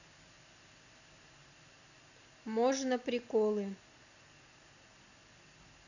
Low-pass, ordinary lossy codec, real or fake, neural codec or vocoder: 7.2 kHz; none; real; none